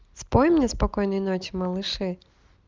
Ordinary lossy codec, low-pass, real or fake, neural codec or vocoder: Opus, 32 kbps; 7.2 kHz; real; none